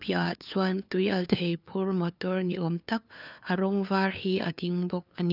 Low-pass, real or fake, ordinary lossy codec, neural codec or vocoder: 5.4 kHz; fake; none; codec, 16 kHz, 2 kbps, FunCodec, trained on LibriTTS, 25 frames a second